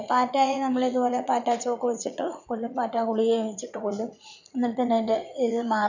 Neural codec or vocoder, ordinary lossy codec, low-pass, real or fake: vocoder, 44.1 kHz, 80 mel bands, Vocos; none; 7.2 kHz; fake